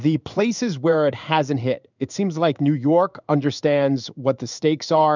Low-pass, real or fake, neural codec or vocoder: 7.2 kHz; fake; codec, 16 kHz in and 24 kHz out, 1 kbps, XY-Tokenizer